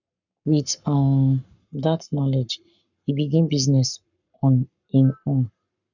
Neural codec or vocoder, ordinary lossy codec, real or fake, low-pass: codec, 44.1 kHz, 7.8 kbps, Pupu-Codec; none; fake; 7.2 kHz